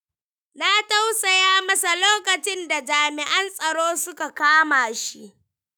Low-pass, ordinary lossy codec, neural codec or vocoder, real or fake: none; none; autoencoder, 48 kHz, 128 numbers a frame, DAC-VAE, trained on Japanese speech; fake